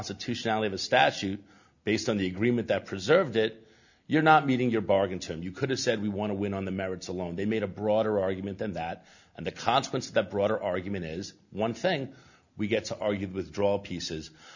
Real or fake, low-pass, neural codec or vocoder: real; 7.2 kHz; none